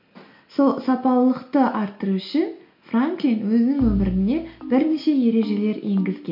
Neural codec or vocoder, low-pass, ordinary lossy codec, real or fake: none; 5.4 kHz; MP3, 32 kbps; real